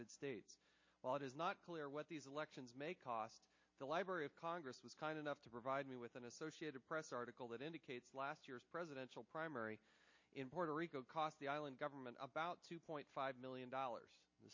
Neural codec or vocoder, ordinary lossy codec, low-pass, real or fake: none; MP3, 32 kbps; 7.2 kHz; real